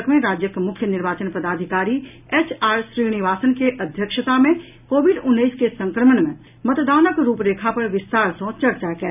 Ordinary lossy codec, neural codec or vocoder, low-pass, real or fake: none; none; 3.6 kHz; real